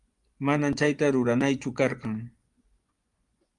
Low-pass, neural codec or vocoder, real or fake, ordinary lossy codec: 10.8 kHz; vocoder, 24 kHz, 100 mel bands, Vocos; fake; Opus, 32 kbps